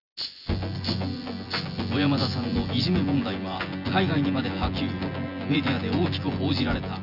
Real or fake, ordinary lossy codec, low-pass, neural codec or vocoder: fake; none; 5.4 kHz; vocoder, 24 kHz, 100 mel bands, Vocos